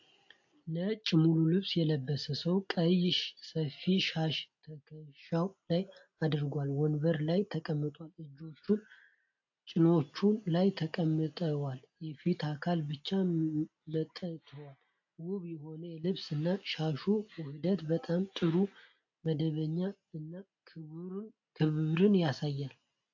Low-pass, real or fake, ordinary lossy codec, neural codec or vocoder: 7.2 kHz; real; AAC, 48 kbps; none